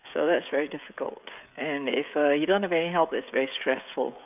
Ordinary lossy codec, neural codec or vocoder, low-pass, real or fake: none; codec, 16 kHz, 16 kbps, FreqCodec, smaller model; 3.6 kHz; fake